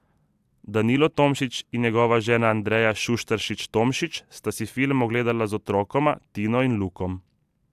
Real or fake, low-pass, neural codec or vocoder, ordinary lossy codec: real; 14.4 kHz; none; AAC, 96 kbps